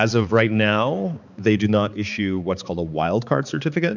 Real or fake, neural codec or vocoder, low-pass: fake; codec, 16 kHz, 4 kbps, X-Codec, HuBERT features, trained on balanced general audio; 7.2 kHz